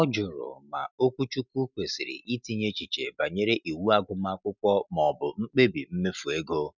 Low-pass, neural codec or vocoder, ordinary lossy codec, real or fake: 7.2 kHz; none; none; real